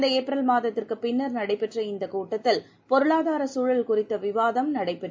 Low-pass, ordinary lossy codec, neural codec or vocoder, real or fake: none; none; none; real